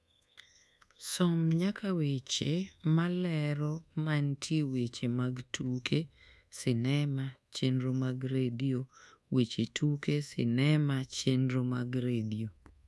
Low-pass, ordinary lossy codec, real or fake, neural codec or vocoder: none; none; fake; codec, 24 kHz, 1.2 kbps, DualCodec